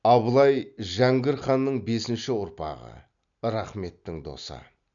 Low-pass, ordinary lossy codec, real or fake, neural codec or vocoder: 7.2 kHz; none; real; none